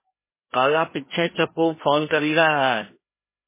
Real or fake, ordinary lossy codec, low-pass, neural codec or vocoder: fake; MP3, 16 kbps; 3.6 kHz; codec, 16 kHz, 1 kbps, FreqCodec, larger model